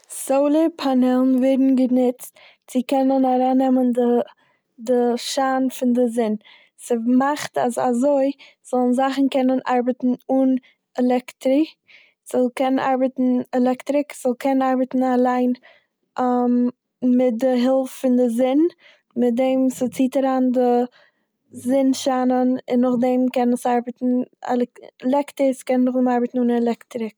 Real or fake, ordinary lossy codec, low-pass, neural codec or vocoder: real; none; none; none